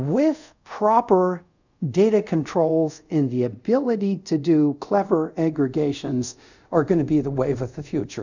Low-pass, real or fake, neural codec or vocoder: 7.2 kHz; fake; codec, 24 kHz, 0.5 kbps, DualCodec